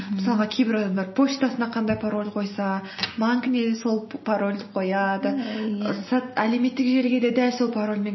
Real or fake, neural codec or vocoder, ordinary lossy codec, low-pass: real; none; MP3, 24 kbps; 7.2 kHz